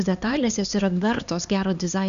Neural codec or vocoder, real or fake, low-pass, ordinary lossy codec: codec, 16 kHz, 2 kbps, X-Codec, HuBERT features, trained on LibriSpeech; fake; 7.2 kHz; MP3, 96 kbps